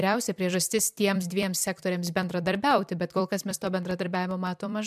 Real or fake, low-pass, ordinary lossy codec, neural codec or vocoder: fake; 14.4 kHz; MP3, 96 kbps; vocoder, 44.1 kHz, 128 mel bands every 256 samples, BigVGAN v2